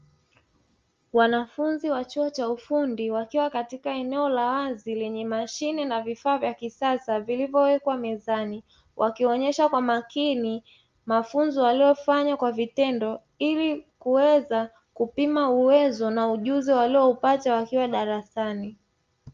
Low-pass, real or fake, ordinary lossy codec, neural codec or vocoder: 7.2 kHz; real; Opus, 32 kbps; none